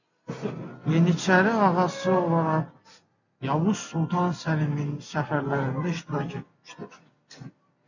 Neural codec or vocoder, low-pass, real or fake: none; 7.2 kHz; real